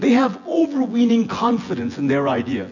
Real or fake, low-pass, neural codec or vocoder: fake; 7.2 kHz; vocoder, 24 kHz, 100 mel bands, Vocos